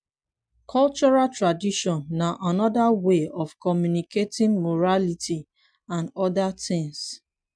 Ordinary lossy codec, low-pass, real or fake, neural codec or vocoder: MP3, 96 kbps; 9.9 kHz; real; none